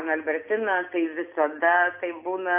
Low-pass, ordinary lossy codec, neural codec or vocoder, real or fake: 3.6 kHz; AAC, 32 kbps; none; real